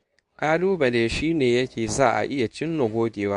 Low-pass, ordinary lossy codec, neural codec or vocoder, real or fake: 10.8 kHz; AAC, 96 kbps; codec, 24 kHz, 0.9 kbps, WavTokenizer, medium speech release version 2; fake